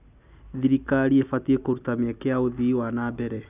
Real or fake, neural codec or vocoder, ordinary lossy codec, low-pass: real; none; none; 3.6 kHz